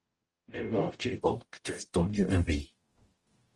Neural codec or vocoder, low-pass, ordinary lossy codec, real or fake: codec, 44.1 kHz, 0.9 kbps, DAC; 10.8 kHz; Opus, 24 kbps; fake